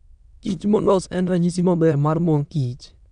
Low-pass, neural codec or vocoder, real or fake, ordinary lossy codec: 9.9 kHz; autoencoder, 22.05 kHz, a latent of 192 numbers a frame, VITS, trained on many speakers; fake; none